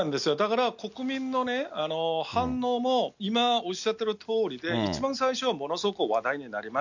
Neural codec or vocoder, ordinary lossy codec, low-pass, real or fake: none; none; 7.2 kHz; real